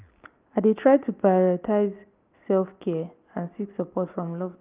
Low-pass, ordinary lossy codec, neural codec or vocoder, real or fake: 3.6 kHz; Opus, 24 kbps; none; real